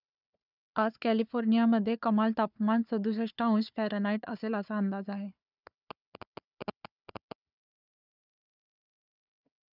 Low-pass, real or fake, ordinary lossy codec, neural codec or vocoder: 5.4 kHz; fake; none; codec, 16 kHz, 4 kbps, FunCodec, trained on Chinese and English, 50 frames a second